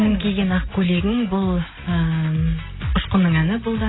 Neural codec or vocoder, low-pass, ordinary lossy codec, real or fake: none; 7.2 kHz; AAC, 16 kbps; real